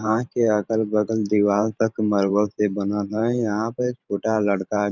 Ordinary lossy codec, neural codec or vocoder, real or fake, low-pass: none; vocoder, 44.1 kHz, 128 mel bands every 512 samples, BigVGAN v2; fake; 7.2 kHz